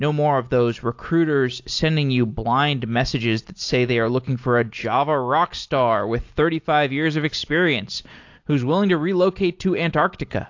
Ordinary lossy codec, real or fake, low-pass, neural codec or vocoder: AAC, 48 kbps; real; 7.2 kHz; none